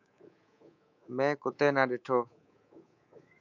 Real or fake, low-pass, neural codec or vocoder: fake; 7.2 kHz; codec, 24 kHz, 3.1 kbps, DualCodec